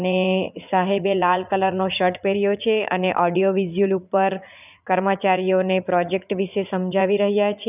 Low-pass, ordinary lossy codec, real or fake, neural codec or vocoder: 3.6 kHz; none; fake; vocoder, 44.1 kHz, 80 mel bands, Vocos